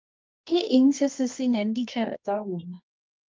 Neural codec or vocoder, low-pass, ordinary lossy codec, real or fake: codec, 24 kHz, 0.9 kbps, WavTokenizer, medium music audio release; 7.2 kHz; Opus, 32 kbps; fake